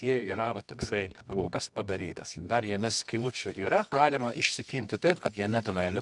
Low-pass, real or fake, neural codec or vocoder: 10.8 kHz; fake; codec, 24 kHz, 0.9 kbps, WavTokenizer, medium music audio release